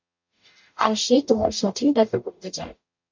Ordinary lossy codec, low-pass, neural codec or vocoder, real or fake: MP3, 48 kbps; 7.2 kHz; codec, 44.1 kHz, 0.9 kbps, DAC; fake